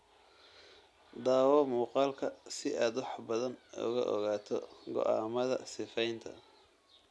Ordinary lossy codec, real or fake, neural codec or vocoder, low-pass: none; real; none; none